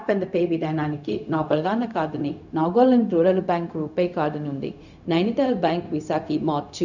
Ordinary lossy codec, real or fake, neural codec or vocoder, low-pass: none; fake; codec, 16 kHz, 0.4 kbps, LongCat-Audio-Codec; 7.2 kHz